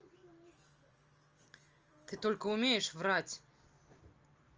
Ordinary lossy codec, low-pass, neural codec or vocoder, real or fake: Opus, 24 kbps; 7.2 kHz; none; real